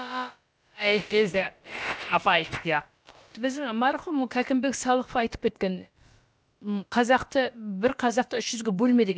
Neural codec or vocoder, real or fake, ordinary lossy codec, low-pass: codec, 16 kHz, about 1 kbps, DyCAST, with the encoder's durations; fake; none; none